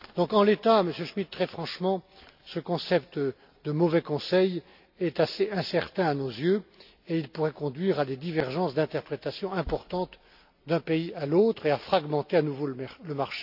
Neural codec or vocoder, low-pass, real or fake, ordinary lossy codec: none; 5.4 kHz; real; AAC, 48 kbps